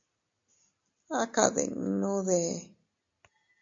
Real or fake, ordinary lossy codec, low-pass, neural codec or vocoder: real; MP3, 48 kbps; 7.2 kHz; none